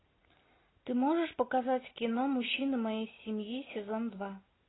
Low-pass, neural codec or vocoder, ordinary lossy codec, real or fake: 7.2 kHz; none; AAC, 16 kbps; real